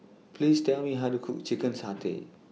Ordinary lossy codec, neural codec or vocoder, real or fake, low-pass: none; none; real; none